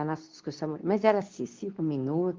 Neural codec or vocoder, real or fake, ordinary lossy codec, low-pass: codec, 24 kHz, 0.9 kbps, WavTokenizer, medium speech release version 2; fake; Opus, 24 kbps; 7.2 kHz